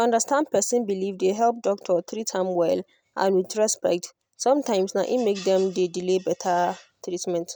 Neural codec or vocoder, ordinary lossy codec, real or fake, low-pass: none; none; real; none